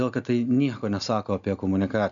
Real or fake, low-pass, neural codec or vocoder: real; 7.2 kHz; none